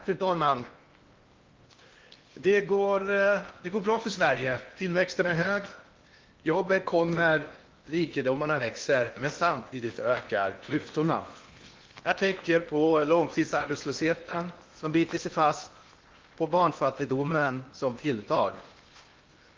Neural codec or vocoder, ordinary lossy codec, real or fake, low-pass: codec, 16 kHz in and 24 kHz out, 0.8 kbps, FocalCodec, streaming, 65536 codes; Opus, 32 kbps; fake; 7.2 kHz